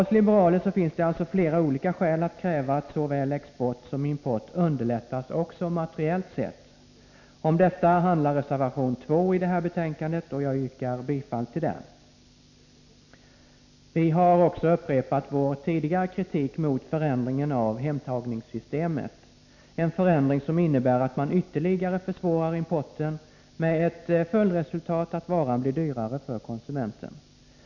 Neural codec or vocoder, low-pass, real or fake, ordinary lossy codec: none; 7.2 kHz; real; none